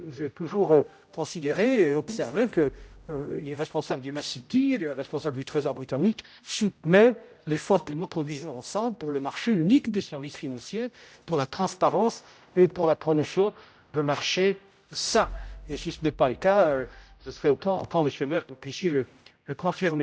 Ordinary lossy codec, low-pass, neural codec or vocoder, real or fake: none; none; codec, 16 kHz, 0.5 kbps, X-Codec, HuBERT features, trained on general audio; fake